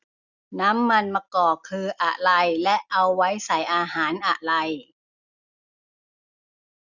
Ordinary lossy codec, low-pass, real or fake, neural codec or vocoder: none; 7.2 kHz; real; none